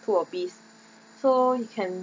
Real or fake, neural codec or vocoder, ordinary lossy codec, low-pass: real; none; none; none